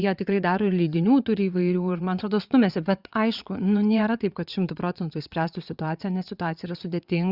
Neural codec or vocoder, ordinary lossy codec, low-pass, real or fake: vocoder, 22.05 kHz, 80 mel bands, WaveNeXt; Opus, 64 kbps; 5.4 kHz; fake